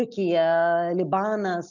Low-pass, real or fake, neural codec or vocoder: 7.2 kHz; real; none